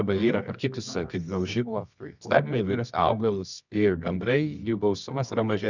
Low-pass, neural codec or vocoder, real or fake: 7.2 kHz; codec, 24 kHz, 0.9 kbps, WavTokenizer, medium music audio release; fake